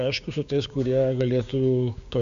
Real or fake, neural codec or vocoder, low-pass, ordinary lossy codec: fake; codec, 16 kHz, 6 kbps, DAC; 7.2 kHz; AAC, 96 kbps